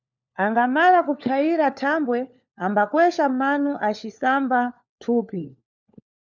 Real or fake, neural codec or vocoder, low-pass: fake; codec, 16 kHz, 4 kbps, FunCodec, trained on LibriTTS, 50 frames a second; 7.2 kHz